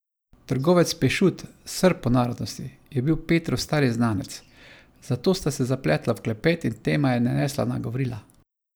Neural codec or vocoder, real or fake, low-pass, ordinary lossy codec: none; real; none; none